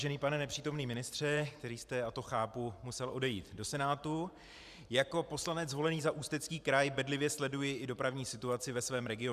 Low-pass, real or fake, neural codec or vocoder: 14.4 kHz; fake; vocoder, 44.1 kHz, 128 mel bands every 512 samples, BigVGAN v2